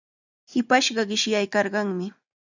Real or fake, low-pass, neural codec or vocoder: real; 7.2 kHz; none